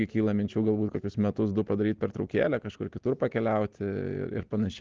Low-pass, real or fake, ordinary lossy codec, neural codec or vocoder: 7.2 kHz; real; Opus, 16 kbps; none